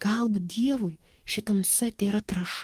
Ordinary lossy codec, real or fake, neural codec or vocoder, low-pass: Opus, 32 kbps; fake; codec, 44.1 kHz, 2.6 kbps, DAC; 14.4 kHz